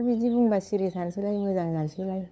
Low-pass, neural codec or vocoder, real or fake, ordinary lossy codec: none; codec, 16 kHz, 8 kbps, FunCodec, trained on LibriTTS, 25 frames a second; fake; none